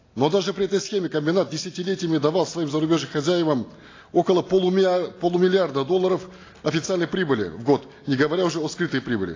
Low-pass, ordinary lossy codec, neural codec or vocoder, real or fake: 7.2 kHz; AAC, 32 kbps; none; real